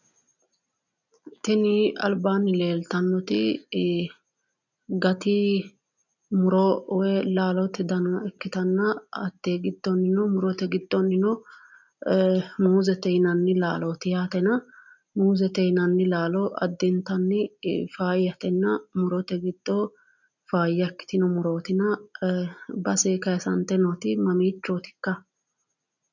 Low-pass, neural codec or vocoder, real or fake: 7.2 kHz; none; real